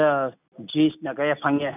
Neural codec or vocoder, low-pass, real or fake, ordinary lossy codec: none; 3.6 kHz; real; none